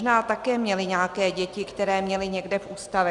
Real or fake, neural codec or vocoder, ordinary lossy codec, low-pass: real; none; Opus, 64 kbps; 10.8 kHz